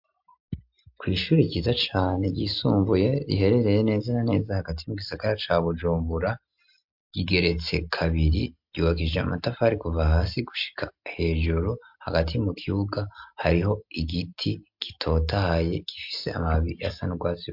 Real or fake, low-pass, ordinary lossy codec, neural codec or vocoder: real; 5.4 kHz; MP3, 48 kbps; none